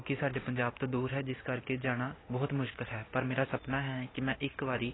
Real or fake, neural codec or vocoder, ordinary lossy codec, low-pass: real; none; AAC, 16 kbps; 7.2 kHz